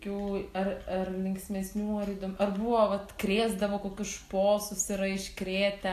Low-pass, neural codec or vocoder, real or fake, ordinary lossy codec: 14.4 kHz; none; real; AAC, 64 kbps